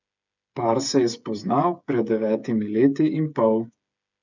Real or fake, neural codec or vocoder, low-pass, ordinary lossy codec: fake; codec, 16 kHz, 8 kbps, FreqCodec, smaller model; 7.2 kHz; none